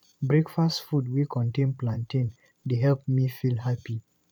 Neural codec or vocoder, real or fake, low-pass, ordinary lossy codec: vocoder, 44.1 kHz, 128 mel bands every 512 samples, BigVGAN v2; fake; 19.8 kHz; none